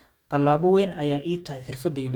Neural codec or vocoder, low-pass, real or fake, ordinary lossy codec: codec, 44.1 kHz, 2.6 kbps, DAC; 19.8 kHz; fake; none